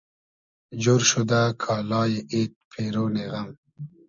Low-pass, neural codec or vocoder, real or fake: 7.2 kHz; none; real